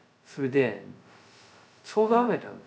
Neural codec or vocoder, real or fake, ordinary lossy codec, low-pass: codec, 16 kHz, 0.2 kbps, FocalCodec; fake; none; none